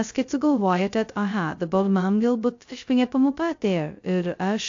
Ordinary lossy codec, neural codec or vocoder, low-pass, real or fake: AAC, 48 kbps; codec, 16 kHz, 0.2 kbps, FocalCodec; 7.2 kHz; fake